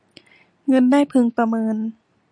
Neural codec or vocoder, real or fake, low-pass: none; real; 9.9 kHz